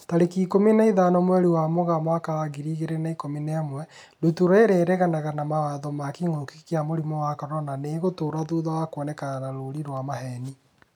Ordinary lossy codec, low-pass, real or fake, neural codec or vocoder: none; 19.8 kHz; real; none